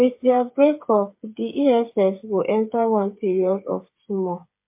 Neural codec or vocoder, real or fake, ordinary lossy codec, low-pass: codec, 16 kHz, 8 kbps, FreqCodec, smaller model; fake; MP3, 24 kbps; 3.6 kHz